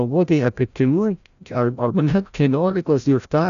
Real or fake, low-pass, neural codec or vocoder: fake; 7.2 kHz; codec, 16 kHz, 0.5 kbps, FreqCodec, larger model